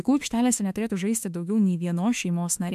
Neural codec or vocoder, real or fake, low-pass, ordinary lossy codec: autoencoder, 48 kHz, 32 numbers a frame, DAC-VAE, trained on Japanese speech; fake; 14.4 kHz; MP3, 96 kbps